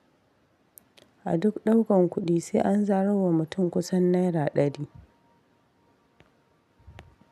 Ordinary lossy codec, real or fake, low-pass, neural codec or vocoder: none; real; 14.4 kHz; none